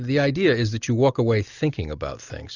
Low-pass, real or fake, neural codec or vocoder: 7.2 kHz; real; none